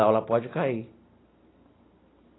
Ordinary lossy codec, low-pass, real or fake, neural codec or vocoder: AAC, 16 kbps; 7.2 kHz; real; none